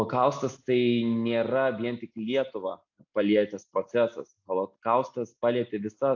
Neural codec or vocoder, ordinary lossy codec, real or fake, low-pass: none; AAC, 48 kbps; real; 7.2 kHz